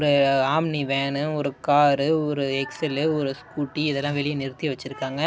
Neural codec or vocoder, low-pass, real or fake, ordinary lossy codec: none; none; real; none